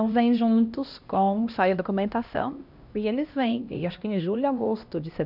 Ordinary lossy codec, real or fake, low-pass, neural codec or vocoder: none; fake; 5.4 kHz; codec, 16 kHz, 1 kbps, X-Codec, HuBERT features, trained on LibriSpeech